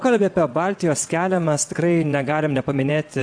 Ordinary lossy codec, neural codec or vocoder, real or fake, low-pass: AAC, 64 kbps; vocoder, 22.05 kHz, 80 mel bands, WaveNeXt; fake; 9.9 kHz